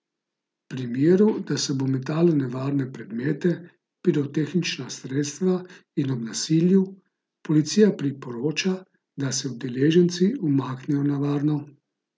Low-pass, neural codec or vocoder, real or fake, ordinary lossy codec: none; none; real; none